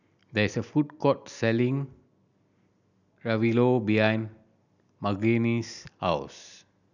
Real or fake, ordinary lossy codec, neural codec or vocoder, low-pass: real; none; none; 7.2 kHz